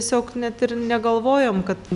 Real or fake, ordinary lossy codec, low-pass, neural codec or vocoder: real; Opus, 64 kbps; 10.8 kHz; none